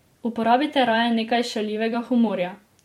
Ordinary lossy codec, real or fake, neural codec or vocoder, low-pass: MP3, 64 kbps; fake; vocoder, 44.1 kHz, 128 mel bands every 256 samples, BigVGAN v2; 19.8 kHz